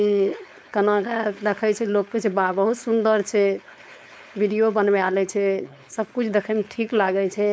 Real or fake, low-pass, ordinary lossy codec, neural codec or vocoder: fake; none; none; codec, 16 kHz, 4.8 kbps, FACodec